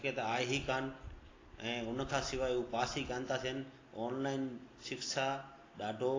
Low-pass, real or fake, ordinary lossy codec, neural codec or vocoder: 7.2 kHz; real; AAC, 32 kbps; none